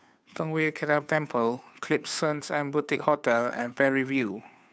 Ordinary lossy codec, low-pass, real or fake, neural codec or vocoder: none; none; fake; codec, 16 kHz, 2 kbps, FunCodec, trained on Chinese and English, 25 frames a second